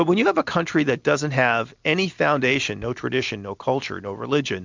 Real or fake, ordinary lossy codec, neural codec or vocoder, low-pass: real; MP3, 64 kbps; none; 7.2 kHz